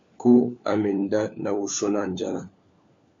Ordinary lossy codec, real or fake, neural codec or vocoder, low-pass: MP3, 48 kbps; fake; codec, 16 kHz, 4 kbps, FunCodec, trained on LibriTTS, 50 frames a second; 7.2 kHz